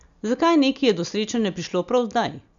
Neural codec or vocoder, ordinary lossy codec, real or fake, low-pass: none; MP3, 96 kbps; real; 7.2 kHz